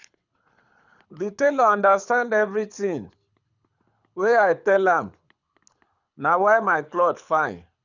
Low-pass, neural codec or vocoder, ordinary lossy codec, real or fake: 7.2 kHz; codec, 24 kHz, 6 kbps, HILCodec; none; fake